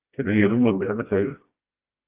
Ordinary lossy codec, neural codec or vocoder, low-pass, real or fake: Opus, 24 kbps; codec, 16 kHz, 1 kbps, FreqCodec, smaller model; 3.6 kHz; fake